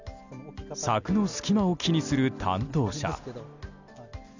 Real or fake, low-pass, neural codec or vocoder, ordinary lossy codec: real; 7.2 kHz; none; none